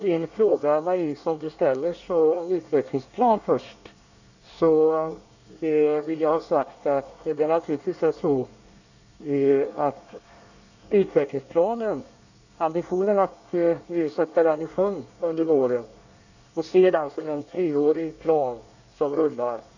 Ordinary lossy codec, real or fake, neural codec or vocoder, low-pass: none; fake; codec, 24 kHz, 1 kbps, SNAC; 7.2 kHz